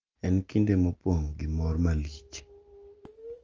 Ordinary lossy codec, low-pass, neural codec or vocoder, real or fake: Opus, 24 kbps; 7.2 kHz; none; real